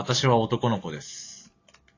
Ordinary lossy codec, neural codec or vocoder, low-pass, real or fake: AAC, 32 kbps; none; 7.2 kHz; real